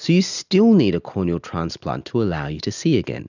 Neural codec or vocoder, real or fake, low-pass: none; real; 7.2 kHz